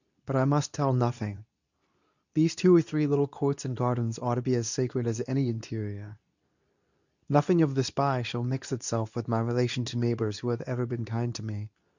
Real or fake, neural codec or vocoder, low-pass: fake; codec, 24 kHz, 0.9 kbps, WavTokenizer, medium speech release version 2; 7.2 kHz